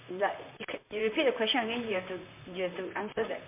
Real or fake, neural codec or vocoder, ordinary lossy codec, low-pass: fake; vocoder, 44.1 kHz, 128 mel bands, Pupu-Vocoder; MP3, 24 kbps; 3.6 kHz